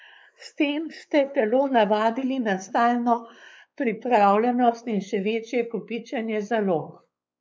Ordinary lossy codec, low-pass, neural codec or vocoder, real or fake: none; none; codec, 16 kHz, 4 kbps, X-Codec, WavLM features, trained on Multilingual LibriSpeech; fake